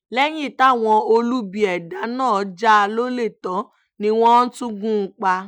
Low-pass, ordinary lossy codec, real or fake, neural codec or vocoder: 19.8 kHz; none; real; none